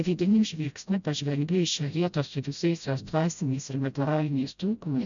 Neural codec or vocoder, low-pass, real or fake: codec, 16 kHz, 0.5 kbps, FreqCodec, smaller model; 7.2 kHz; fake